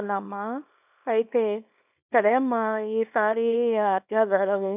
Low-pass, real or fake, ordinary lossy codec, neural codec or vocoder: 3.6 kHz; fake; none; codec, 24 kHz, 0.9 kbps, WavTokenizer, small release